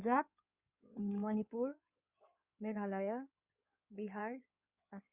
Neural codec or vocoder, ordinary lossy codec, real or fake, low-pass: codec, 16 kHz in and 24 kHz out, 2.2 kbps, FireRedTTS-2 codec; Opus, 64 kbps; fake; 3.6 kHz